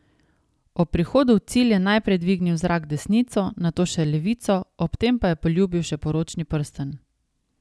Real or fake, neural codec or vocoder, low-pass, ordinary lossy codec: real; none; none; none